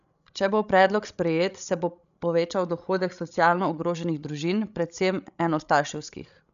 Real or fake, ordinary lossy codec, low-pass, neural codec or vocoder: fake; none; 7.2 kHz; codec, 16 kHz, 16 kbps, FreqCodec, larger model